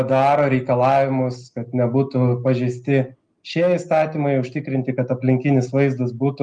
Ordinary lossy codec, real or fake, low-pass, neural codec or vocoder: Opus, 32 kbps; real; 9.9 kHz; none